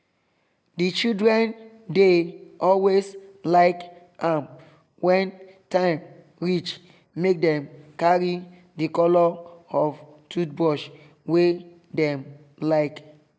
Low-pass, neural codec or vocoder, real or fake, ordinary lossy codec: none; none; real; none